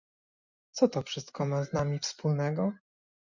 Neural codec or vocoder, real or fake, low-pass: none; real; 7.2 kHz